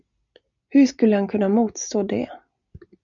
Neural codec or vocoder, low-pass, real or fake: none; 7.2 kHz; real